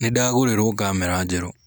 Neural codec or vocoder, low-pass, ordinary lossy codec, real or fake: none; none; none; real